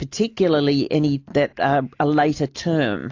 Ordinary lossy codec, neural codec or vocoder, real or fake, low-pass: AAC, 48 kbps; none; real; 7.2 kHz